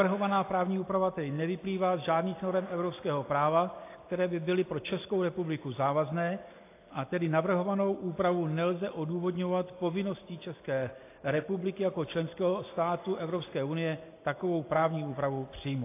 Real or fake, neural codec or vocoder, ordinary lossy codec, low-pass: real; none; AAC, 24 kbps; 3.6 kHz